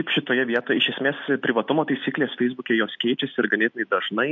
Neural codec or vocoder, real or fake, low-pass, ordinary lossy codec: none; real; 7.2 kHz; MP3, 48 kbps